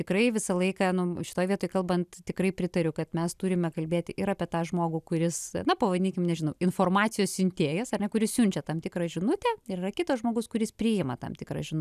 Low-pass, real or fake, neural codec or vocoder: 14.4 kHz; real; none